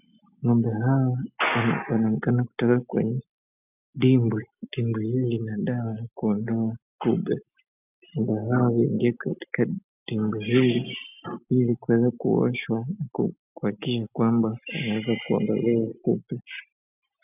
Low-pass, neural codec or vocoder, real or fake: 3.6 kHz; none; real